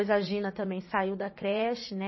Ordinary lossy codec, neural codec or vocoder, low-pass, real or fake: MP3, 24 kbps; vocoder, 44.1 kHz, 80 mel bands, Vocos; 7.2 kHz; fake